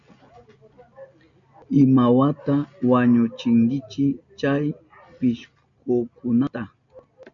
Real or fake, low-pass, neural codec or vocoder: real; 7.2 kHz; none